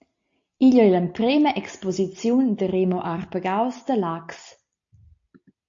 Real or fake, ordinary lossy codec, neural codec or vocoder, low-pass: real; AAC, 48 kbps; none; 7.2 kHz